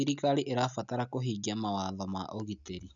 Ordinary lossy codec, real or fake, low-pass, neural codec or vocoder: none; real; 7.2 kHz; none